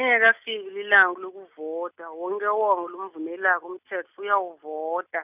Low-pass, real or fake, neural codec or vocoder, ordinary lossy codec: 3.6 kHz; real; none; none